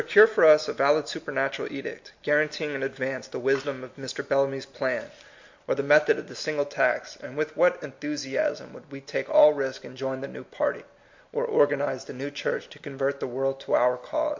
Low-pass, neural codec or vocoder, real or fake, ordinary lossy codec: 7.2 kHz; none; real; MP3, 48 kbps